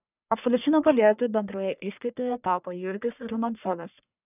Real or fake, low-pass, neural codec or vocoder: fake; 3.6 kHz; codec, 44.1 kHz, 1.7 kbps, Pupu-Codec